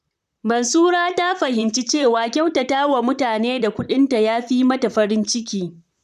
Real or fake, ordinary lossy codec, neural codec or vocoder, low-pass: fake; none; vocoder, 44.1 kHz, 128 mel bands, Pupu-Vocoder; 14.4 kHz